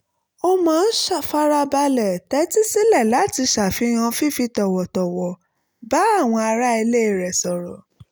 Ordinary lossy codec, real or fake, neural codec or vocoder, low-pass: none; real; none; none